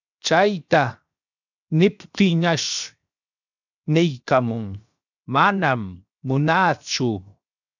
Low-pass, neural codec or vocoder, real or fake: 7.2 kHz; codec, 16 kHz, 0.7 kbps, FocalCodec; fake